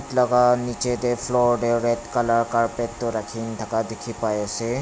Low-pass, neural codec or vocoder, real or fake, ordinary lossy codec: none; none; real; none